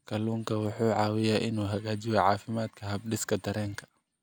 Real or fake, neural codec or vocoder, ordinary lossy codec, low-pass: real; none; none; none